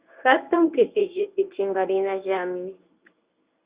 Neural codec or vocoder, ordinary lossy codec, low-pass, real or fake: codec, 24 kHz, 0.9 kbps, WavTokenizer, medium speech release version 1; Opus, 64 kbps; 3.6 kHz; fake